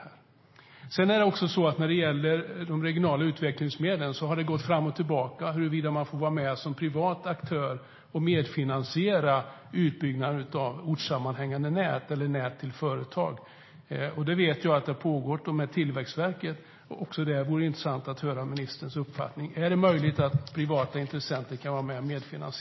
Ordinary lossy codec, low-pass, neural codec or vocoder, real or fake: MP3, 24 kbps; 7.2 kHz; none; real